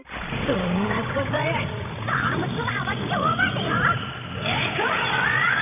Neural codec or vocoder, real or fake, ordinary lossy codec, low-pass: codec, 16 kHz, 16 kbps, FreqCodec, larger model; fake; none; 3.6 kHz